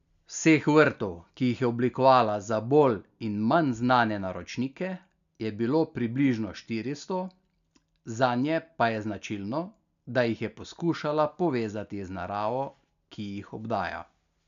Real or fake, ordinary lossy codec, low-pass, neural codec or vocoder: real; none; 7.2 kHz; none